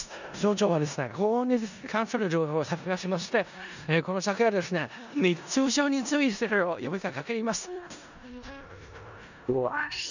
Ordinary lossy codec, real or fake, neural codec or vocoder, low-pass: none; fake; codec, 16 kHz in and 24 kHz out, 0.4 kbps, LongCat-Audio-Codec, four codebook decoder; 7.2 kHz